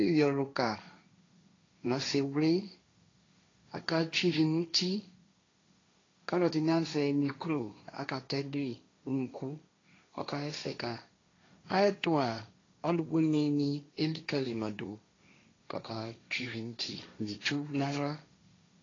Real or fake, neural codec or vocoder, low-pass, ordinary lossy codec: fake; codec, 16 kHz, 1.1 kbps, Voila-Tokenizer; 7.2 kHz; AAC, 32 kbps